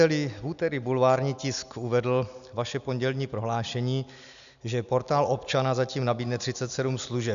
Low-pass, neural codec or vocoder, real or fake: 7.2 kHz; none; real